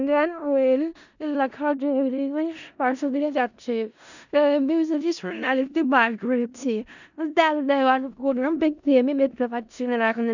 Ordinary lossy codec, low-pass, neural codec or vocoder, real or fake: none; 7.2 kHz; codec, 16 kHz in and 24 kHz out, 0.4 kbps, LongCat-Audio-Codec, four codebook decoder; fake